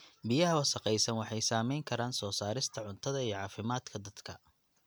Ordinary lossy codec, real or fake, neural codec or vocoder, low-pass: none; real; none; none